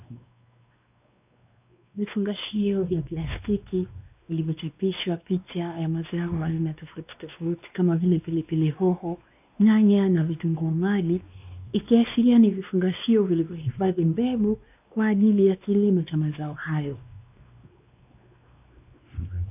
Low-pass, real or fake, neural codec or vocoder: 3.6 kHz; fake; codec, 16 kHz, 2 kbps, X-Codec, WavLM features, trained on Multilingual LibriSpeech